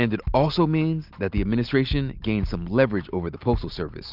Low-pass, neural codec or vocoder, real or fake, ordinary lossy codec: 5.4 kHz; none; real; Opus, 24 kbps